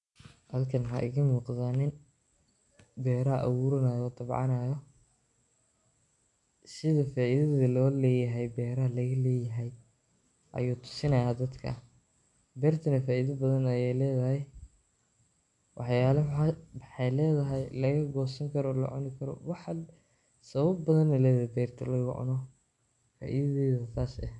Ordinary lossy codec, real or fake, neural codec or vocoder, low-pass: MP3, 64 kbps; real; none; 10.8 kHz